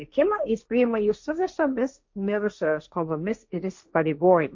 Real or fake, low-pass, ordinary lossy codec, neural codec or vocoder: fake; 7.2 kHz; MP3, 48 kbps; codec, 16 kHz, 1.1 kbps, Voila-Tokenizer